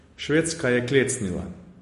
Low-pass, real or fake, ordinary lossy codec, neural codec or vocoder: 14.4 kHz; real; MP3, 48 kbps; none